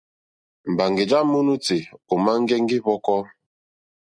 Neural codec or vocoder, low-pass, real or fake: none; 9.9 kHz; real